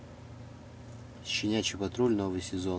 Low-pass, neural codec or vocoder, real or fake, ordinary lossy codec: none; none; real; none